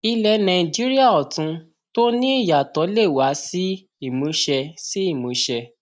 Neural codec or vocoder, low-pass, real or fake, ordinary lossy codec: none; none; real; none